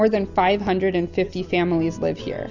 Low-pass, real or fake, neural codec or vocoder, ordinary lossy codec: 7.2 kHz; real; none; Opus, 64 kbps